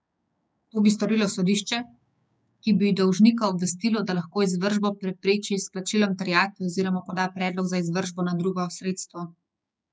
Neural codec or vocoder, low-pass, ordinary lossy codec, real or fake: codec, 16 kHz, 6 kbps, DAC; none; none; fake